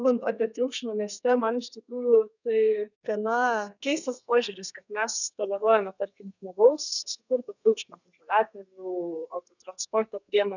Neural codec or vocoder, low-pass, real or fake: codec, 32 kHz, 1.9 kbps, SNAC; 7.2 kHz; fake